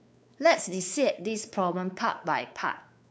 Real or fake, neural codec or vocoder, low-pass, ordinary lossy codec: fake; codec, 16 kHz, 4 kbps, X-Codec, WavLM features, trained on Multilingual LibriSpeech; none; none